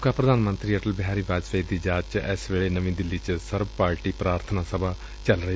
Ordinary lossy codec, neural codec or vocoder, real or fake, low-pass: none; none; real; none